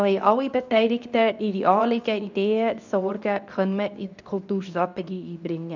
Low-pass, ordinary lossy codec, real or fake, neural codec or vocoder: 7.2 kHz; none; fake; codec, 24 kHz, 0.9 kbps, WavTokenizer, medium speech release version 2